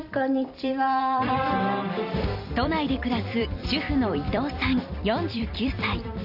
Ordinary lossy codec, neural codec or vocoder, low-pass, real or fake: none; vocoder, 44.1 kHz, 128 mel bands every 256 samples, BigVGAN v2; 5.4 kHz; fake